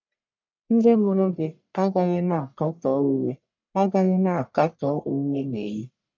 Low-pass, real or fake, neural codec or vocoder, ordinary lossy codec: 7.2 kHz; fake; codec, 44.1 kHz, 1.7 kbps, Pupu-Codec; MP3, 64 kbps